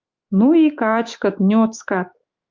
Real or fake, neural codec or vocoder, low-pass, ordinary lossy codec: fake; autoencoder, 48 kHz, 128 numbers a frame, DAC-VAE, trained on Japanese speech; 7.2 kHz; Opus, 24 kbps